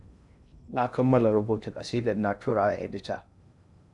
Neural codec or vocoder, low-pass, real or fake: codec, 16 kHz in and 24 kHz out, 0.6 kbps, FocalCodec, streaming, 2048 codes; 10.8 kHz; fake